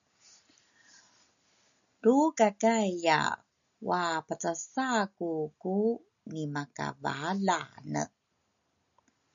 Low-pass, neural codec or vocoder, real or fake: 7.2 kHz; none; real